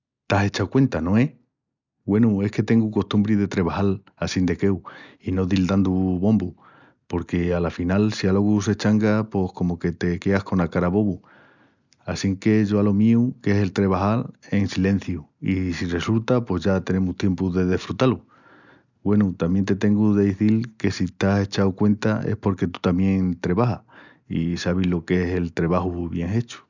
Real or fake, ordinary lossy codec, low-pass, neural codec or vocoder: real; none; 7.2 kHz; none